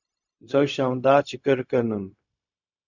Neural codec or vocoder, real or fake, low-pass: codec, 16 kHz, 0.4 kbps, LongCat-Audio-Codec; fake; 7.2 kHz